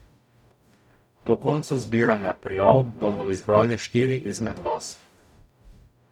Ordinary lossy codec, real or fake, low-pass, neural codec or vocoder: none; fake; 19.8 kHz; codec, 44.1 kHz, 0.9 kbps, DAC